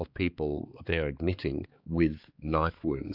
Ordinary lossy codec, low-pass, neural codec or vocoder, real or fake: AAC, 48 kbps; 5.4 kHz; codec, 16 kHz, 4 kbps, X-Codec, HuBERT features, trained on balanced general audio; fake